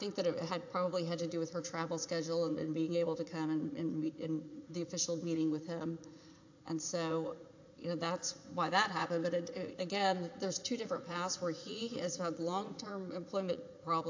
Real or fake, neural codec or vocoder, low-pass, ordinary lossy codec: fake; vocoder, 44.1 kHz, 80 mel bands, Vocos; 7.2 kHz; AAC, 48 kbps